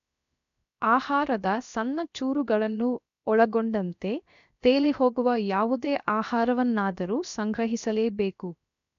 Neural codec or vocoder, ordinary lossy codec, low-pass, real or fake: codec, 16 kHz, 0.7 kbps, FocalCodec; AAC, 96 kbps; 7.2 kHz; fake